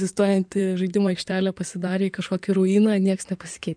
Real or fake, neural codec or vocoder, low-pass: fake; codec, 16 kHz in and 24 kHz out, 2.2 kbps, FireRedTTS-2 codec; 9.9 kHz